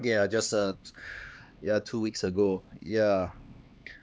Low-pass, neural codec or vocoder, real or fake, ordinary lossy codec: none; codec, 16 kHz, 2 kbps, X-Codec, HuBERT features, trained on LibriSpeech; fake; none